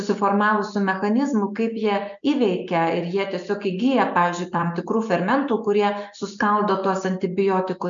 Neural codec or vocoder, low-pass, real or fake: none; 7.2 kHz; real